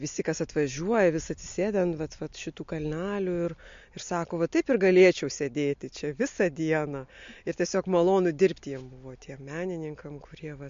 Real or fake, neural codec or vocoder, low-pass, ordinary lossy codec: real; none; 7.2 kHz; MP3, 48 kbps